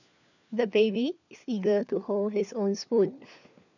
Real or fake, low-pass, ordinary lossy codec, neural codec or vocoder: fake; 7.2 kHz; none; codec, 16 kHz, 4 kbps, FunCodec, trained on LibriTTS, 50 frames a second